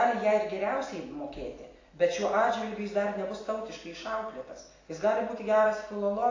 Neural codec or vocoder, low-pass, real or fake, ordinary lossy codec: none; 7.2 kHz; real; AAC, 32 kbps